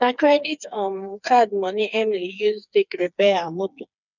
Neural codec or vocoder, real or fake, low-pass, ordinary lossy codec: codec, 16 kHz, 4 kbps, FreqCodec, smaller model; fake; 7.2 kHz; none